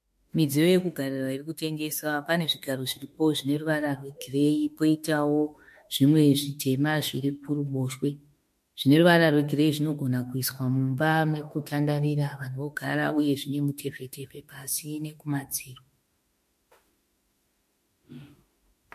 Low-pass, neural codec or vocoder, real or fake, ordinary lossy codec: 14.4 kHz; autoencoder, 48 kHz, 32 numbers a frame, DAC-VAE, trained on Japanese speech; fake; MP3, 64 kbps